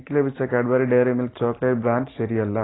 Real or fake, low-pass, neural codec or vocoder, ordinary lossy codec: real; 7.2 kHz; none; AAC, 16 kbps